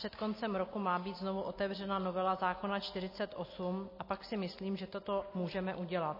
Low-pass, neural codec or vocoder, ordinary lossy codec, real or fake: 5.4 kHz; none; MP3, 24 kbps; real